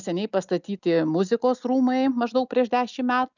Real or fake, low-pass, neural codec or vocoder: real; 7.2 kHz; none